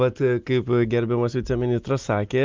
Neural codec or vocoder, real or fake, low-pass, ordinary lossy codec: none; real; 7.2 kHz; Opus, 32 kbps